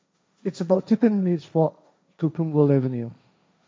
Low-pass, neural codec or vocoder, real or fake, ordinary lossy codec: none; codec, 16 kHz, 1.1 kbps, Voila-Tokenizer; fake; none